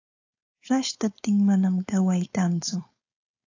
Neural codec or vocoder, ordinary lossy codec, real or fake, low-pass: codec, 16 kHz, 4.8 kbps, FACodec; AAC, 48 kbps; fake; 7.2 kHz